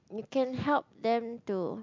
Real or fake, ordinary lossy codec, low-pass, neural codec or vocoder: real; MP3, 64 kbps; 7.2 kHz; none